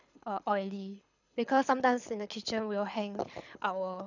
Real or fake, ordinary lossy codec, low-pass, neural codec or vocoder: fake; none; 7.2 kHz; codec, 24 kHz, 6 kbps, HILCodec